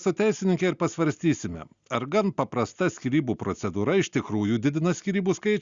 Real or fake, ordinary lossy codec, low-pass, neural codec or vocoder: real; Opus, 64 kbps; 7.2 kHz; none